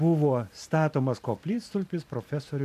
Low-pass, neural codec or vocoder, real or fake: 14.4 kHz; vocoder, 44.1 kHz, 128 mel bands every 512 samples, BigVGAN v2; fake